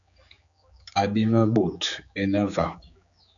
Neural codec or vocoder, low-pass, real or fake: codec, 16 kHz, 4 kbps, X-Codec, HuBERT features, trained on general audio; 7.2 kHz; fake